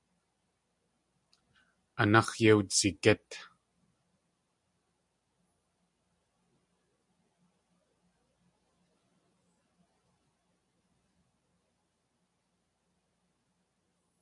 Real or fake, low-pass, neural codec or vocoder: real; 10.8 kHz; none